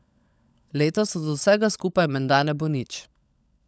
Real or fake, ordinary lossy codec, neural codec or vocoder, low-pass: fake; none; codec, 16 kHz, 16 kbps, FunCodec, trained on LibriTTS, 50 frames a second; none